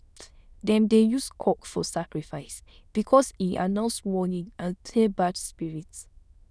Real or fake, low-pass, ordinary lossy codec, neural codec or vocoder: fake; none; none; autoencoder, 22.05 kHz, a latent of 192 numbers a frame, VITS, trained on many speakers